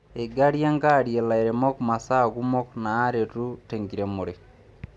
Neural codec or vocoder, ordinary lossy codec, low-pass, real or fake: none; none; none; real